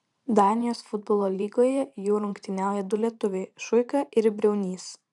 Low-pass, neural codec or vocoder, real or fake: 10.8 kHz; vocoder, 44.1 kHz, 128 mel bands every 512 samples, BigVGAN v2; fake